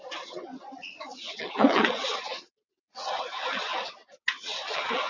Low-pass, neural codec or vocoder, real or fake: 7.2 kHz; vocoder, 22.05 kHz, 80 mel bands, WaveNeXt; fake